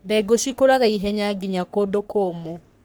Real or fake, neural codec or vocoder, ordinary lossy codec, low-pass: fake; codec, 44.1 kHz, 3.4 kbps, Pupu-Codec; none; none